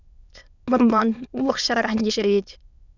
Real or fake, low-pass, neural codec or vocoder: fake; 7.2 kHz; autoencoder, 22.05 kHz, a latent of 192 numbers a frame, VITS, trained on many speakers